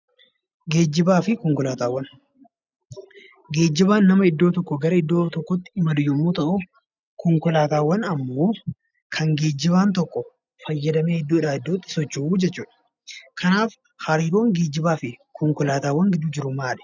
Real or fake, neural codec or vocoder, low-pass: real; none; 7.2 kHz